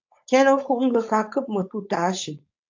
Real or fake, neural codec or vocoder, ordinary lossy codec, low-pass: fake; codec, 16 kHz, 4.8 kbps, FACodec; AAC, 48 kbps; 7.2 kHz